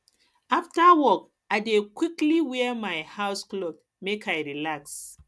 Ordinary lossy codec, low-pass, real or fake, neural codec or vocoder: none; none; real; none